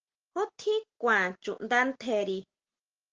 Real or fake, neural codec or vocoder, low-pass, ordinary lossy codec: real; none; 7.2 kHz; Opus, 16 kbps